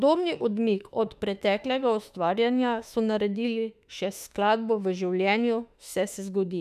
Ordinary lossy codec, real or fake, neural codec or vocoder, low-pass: none; fake; autoencoder, 48 kHz, 32 numbers a frame, DAC-VAE, trained on Japanese speech; 14.4 kHz